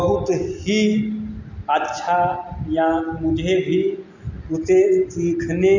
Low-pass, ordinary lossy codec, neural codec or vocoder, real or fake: 7.2 kHz; none; none; real